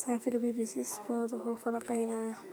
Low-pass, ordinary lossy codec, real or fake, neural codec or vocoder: none; none; fake; codec, 44.1 kHz, 2.6 kbps, SNAC